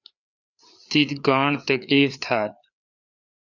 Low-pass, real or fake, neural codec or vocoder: 7.2 kHz; fake; codec, 16 kHz, 4 kbps, FreqCodec, larger model